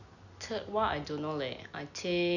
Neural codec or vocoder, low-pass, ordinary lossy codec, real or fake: none; 7.2 kHz; none; real